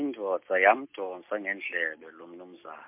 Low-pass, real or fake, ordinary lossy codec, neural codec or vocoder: 3.6 kHz; real; MP3, 32 kbps; none